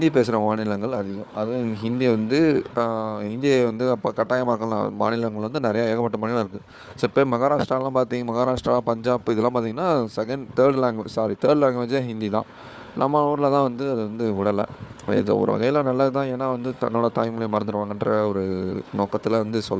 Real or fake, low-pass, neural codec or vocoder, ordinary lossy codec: fake; none; codec, 16 kHz, 4 kbps, FunCodec, trained on Chinese and English, 50 frames a second; none